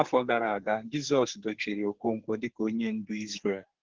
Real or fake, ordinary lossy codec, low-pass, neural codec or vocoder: fake; Opus, 16 kbps; 7.2 kHz; codec, 32 kHz, 1.9 kbps, SNAC